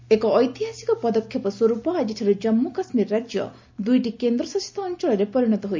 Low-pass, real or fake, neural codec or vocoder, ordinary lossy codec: 7.2 kHz; real; none; MP3, 64 kbps